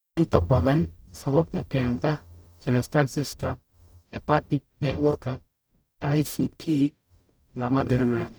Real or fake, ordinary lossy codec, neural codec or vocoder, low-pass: fake; none; codec, 44.1 kHz, 0.9 kbps, DAC; none